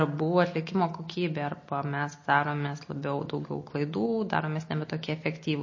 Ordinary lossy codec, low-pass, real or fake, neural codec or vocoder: MP3, 32 kbps; 7.2 kHz; real; none